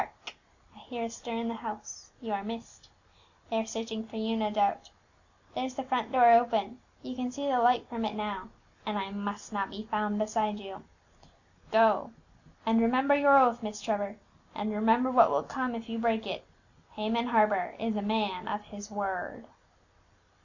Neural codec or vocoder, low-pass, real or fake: none; 7.2 kHz; real